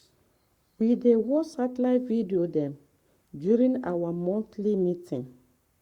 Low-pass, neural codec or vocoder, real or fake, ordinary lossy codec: 19.8 kHz; codec, 44.1 kHz, 7.8 kbps, Pupu-Codec; fake; Opus, 64 kbps